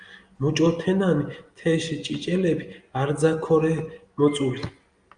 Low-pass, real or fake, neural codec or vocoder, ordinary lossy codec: 9.9 kHz; real; none; Opus, 32 kbps